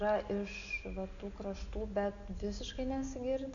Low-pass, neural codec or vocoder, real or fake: 7.2 kHz; none; real